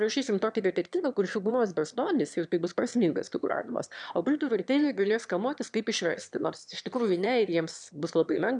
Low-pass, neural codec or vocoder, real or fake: 9.9 kHz; autoencoder, 22.05 kHz, a latent of 192 numbers a frame, VITS, trained on one speaker; fake